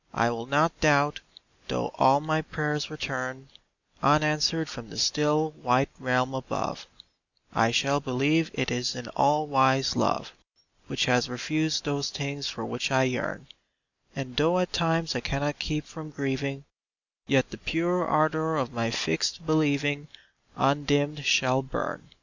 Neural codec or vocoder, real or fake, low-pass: none; real; 7.2 kHz